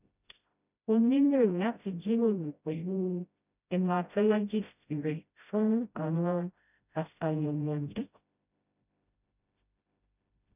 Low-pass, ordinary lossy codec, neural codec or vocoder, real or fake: 3.6 kHz; none; codec, 16 kHz, 0.5 kbps, FreqCodec, smaller model; fake